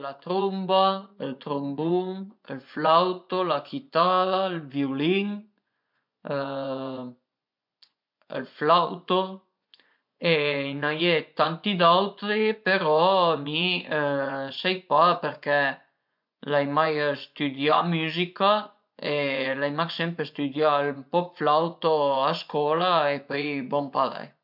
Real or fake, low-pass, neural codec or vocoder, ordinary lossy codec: fake; 5.4 kHz; vocoder, 24 kHz, 100 mel bands, Vocos; MP3, 48 kbps